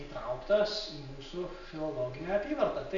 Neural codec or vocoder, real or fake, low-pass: none; real; 7.2 kHz